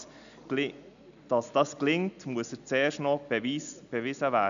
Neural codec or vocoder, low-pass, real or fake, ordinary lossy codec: none; 7.2 kHz; real; none